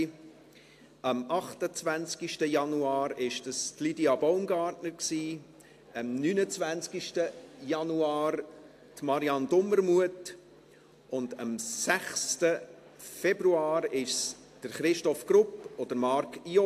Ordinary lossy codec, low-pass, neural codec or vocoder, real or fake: AAC, 64 kbps; 14.4 kHz; none; real